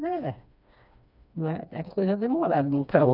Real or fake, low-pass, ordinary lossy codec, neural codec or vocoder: fake; 5.4 kHz; none; codec, 16 kHz, 2 kbps, FreqCodec, smaller model